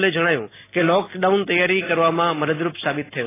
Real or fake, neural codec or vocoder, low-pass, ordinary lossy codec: real; none; 3.6 kHz; AAC, 16 kbps